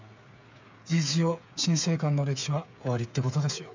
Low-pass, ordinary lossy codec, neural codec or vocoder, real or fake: 7.2 kHz; MP3, 64 kbps; codec, 16 kHz, 8 kbps, FreqCodec, smaller model; fake